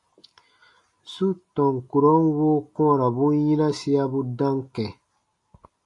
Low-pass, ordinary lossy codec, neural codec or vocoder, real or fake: 10.8 kHz; AAC, 48 kbps; none; real